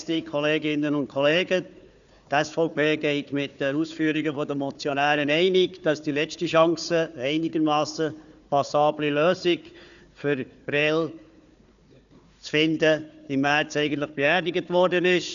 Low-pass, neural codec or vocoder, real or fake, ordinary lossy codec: 7.2 kHz; codec, 16 kHz, 4 kbps, FunCodec, trained on Chinese and English, 50 frames a second; fake; none